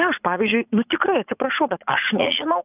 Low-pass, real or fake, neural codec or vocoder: 3.6 kHz; fake; vocoder, 44.1 kHz, 80 mel bands, Vocos